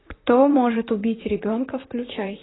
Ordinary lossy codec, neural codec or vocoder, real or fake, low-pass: AAC, 16 kbps; vocoder, 44.1 kHz, 128 mel bands, Pupu-Vocoder; fake; 7.2 kHz